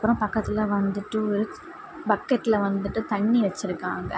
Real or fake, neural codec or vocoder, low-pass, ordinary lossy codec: real; none; none; none